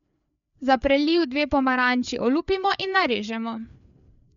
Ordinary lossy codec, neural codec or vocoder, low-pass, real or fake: none; codec, 16 kHz, 4 kbps, FreqCodec, larger model; 7.2 kHz; fake